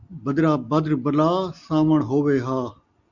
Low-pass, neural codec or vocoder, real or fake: 7.2 kHz; none; real